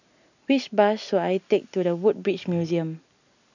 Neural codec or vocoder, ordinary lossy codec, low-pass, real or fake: none; none; 7.2 kHz; real